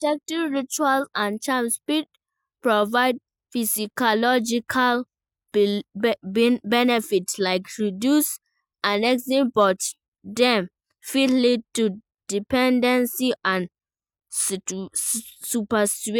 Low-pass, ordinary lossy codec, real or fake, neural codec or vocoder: none; none; real; none